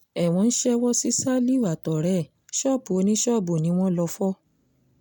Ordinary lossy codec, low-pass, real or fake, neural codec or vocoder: none; none; real; none